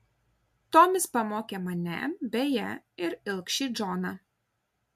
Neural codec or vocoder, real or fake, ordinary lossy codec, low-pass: none; real; MP3, 64 kbps; 14.4 kHz